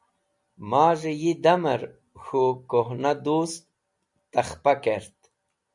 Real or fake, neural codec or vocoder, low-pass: real; none; 10.8 kHz